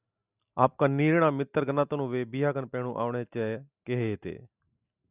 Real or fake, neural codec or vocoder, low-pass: real; none; 3.6 kHz